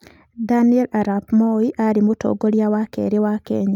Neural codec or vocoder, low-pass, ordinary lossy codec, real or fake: none; 19.8 kHz; none; real